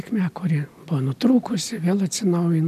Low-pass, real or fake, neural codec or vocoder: 14.4 kHz; real; none